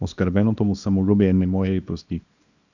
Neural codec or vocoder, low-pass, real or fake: codec, 24 kHz, 0.9 kbps, WavTokenizer, small release; 7.2 kHz; fake